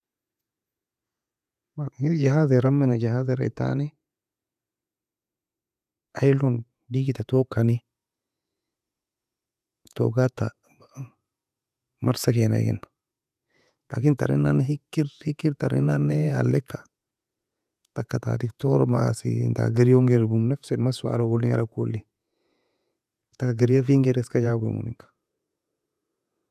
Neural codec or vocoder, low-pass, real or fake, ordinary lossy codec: vocoder, 48 kHz, 128 mel bands, Vocos; 14.4 kHz; fake; none